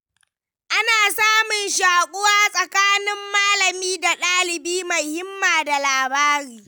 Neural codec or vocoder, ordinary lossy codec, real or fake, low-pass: none; none; real; none